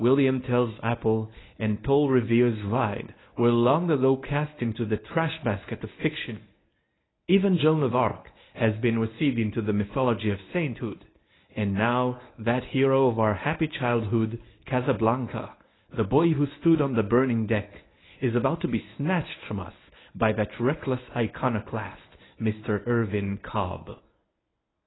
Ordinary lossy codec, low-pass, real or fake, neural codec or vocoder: AAC, 16 kbps; 7.2 kHz; fake; codec, 24 kHz, 0.9 kbps, WavTokenizer, medium speech release version 1